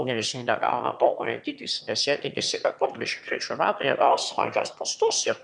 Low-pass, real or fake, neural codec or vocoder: 9.9 kHz; fake; autoencoder, 22.05 kHz, a latent of 192 numbers a frame, VITS, trained on one speaker